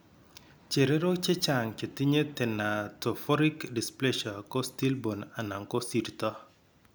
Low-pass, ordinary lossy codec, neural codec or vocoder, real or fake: none; none; none; real